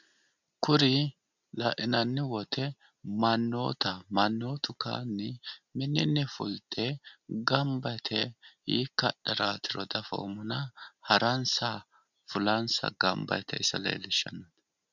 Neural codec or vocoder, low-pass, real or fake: none; 7.2 kHz; real